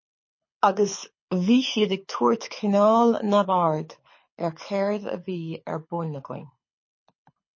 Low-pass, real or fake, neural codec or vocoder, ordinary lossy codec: 7.2 kHz; fake; codec, 24 kHz, 6 kbps, HILCodec; MP3, 32 kbps